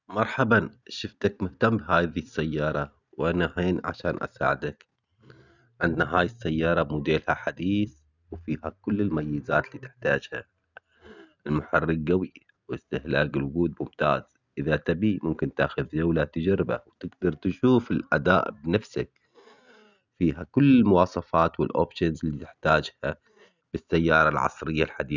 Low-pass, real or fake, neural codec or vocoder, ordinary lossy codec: 7.2 kHz; real; none; none